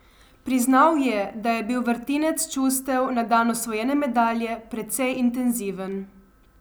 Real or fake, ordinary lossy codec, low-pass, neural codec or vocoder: real; none; none; none